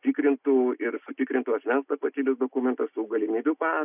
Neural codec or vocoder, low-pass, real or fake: none; 3.6 kHz; real